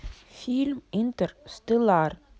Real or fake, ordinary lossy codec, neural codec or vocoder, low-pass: real; none; none; none